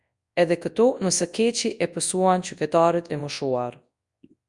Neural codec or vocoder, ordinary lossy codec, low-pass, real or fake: codec, 24 kHz, 0.9 kbps, WavTokenizer, large speech release; Opus, 64 kbps; 10.8 kHz; fake